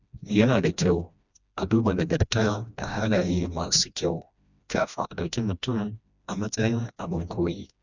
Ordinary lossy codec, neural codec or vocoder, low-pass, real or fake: none; codec, 16 kHz, 1 kbps, FreqCodec, smaller model; 7.2 kHz; fake